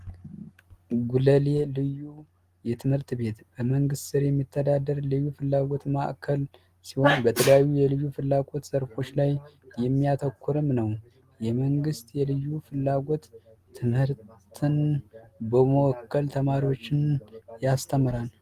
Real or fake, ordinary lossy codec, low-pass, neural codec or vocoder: real; Opus, 24 kbps; 14.4 kHz; none